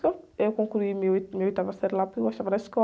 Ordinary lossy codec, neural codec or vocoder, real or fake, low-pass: none; none; real; none